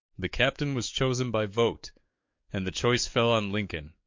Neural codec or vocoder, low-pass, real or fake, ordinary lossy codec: codec, 44.1 kHz, 7.8 kbps, DAC; 7.2 kHz; fake; MP3, 48 kbps